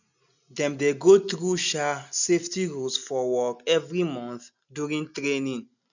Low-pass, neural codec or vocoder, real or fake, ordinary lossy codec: 7.2 kHz; none; real; none